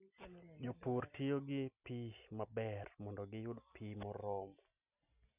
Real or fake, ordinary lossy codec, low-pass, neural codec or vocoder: real; none; 3.6 kHz; none